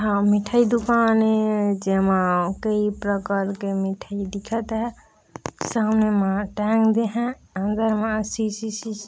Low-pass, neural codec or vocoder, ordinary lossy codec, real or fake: none; none; none; real